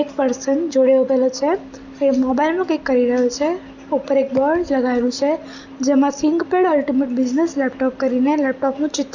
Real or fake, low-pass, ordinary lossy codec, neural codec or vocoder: real; 7.2 kHz; none; none